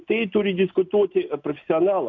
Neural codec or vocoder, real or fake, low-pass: none; real; 7.2 kHz